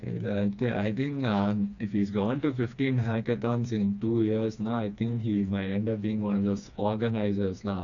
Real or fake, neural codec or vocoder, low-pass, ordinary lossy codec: fake; codec, 16 kHz, 2 kbps, FreqCodec, smaller model; 7.2 kHz; none